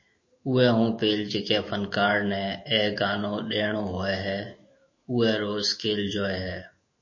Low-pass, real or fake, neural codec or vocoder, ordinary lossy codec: 7.2 kHz; fake; autoencoder, 48 kHz, 128 numbers a frame, DAC-VAE, trained on Japanese speech; MP3, 32 kbps